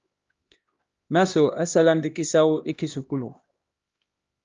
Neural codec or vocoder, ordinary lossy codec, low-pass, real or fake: codec, 16 kHz, 1 kbps, X-Codec, HuBERT features, trained on LibriSpeech; Opus, 32 kbps; 7.2 kHz; fake